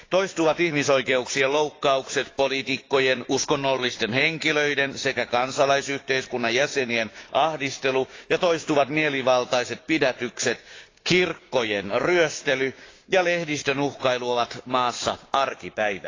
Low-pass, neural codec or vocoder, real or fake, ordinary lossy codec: 7.2 kHz; codec, 16 kHz, 6 kbps, DAC; fake; AAC, 32 kbps